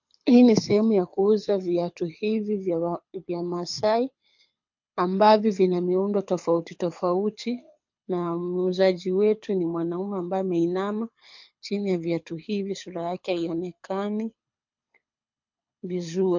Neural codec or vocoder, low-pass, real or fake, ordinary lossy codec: codec, 24 kHz, 6 kbps, HILCodec; 7.2 kHz; fake; MP3, 48 kbps